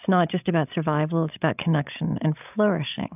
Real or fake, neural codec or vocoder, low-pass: fake; codec, 16 kHz, 16 kbps, FreqCodec, larger model; 3.6 kHz